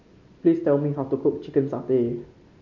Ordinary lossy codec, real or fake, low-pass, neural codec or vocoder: MP3, 64 kbps; real; 7.2 kHz; none